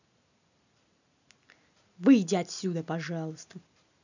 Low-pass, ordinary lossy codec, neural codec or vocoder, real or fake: 7.2 kHz; none; none; real